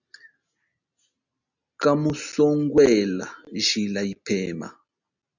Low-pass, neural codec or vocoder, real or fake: 7.2 kHz; none; real